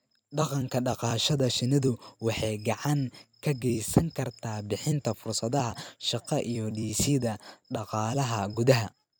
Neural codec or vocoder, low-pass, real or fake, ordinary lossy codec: vocoder, 44.1 kHz, 128 mel bands every 256 samples, BigVGAN v2; none; fake; none